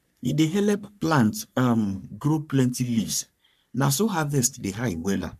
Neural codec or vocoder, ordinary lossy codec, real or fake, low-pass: codec, 44.1 kHz, 3.4 kbps, Pupu-Codec; none; fake; 14.4 kHz